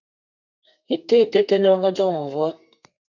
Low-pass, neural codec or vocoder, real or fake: 7.2 kHz; codec, 32 kHz, 1.9 kbps, SNAC; fake